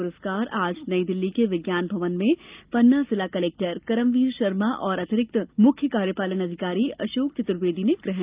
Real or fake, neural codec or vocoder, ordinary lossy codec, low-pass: real; none; Opus, 32 kbps; 3.6 kHz